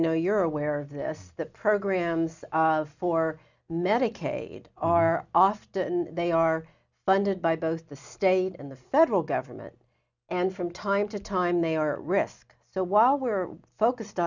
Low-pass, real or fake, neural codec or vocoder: 7.2 kHz; real; none